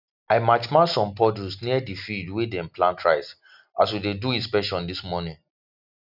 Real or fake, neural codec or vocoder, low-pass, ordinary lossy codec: real; none; 5.4 kHz; none